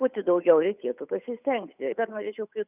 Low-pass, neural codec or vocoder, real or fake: 3.6 kHz; codec, 16 kHz, 8 kbps, FunCodec, trained on Chinese and English, 25 frames a second; fake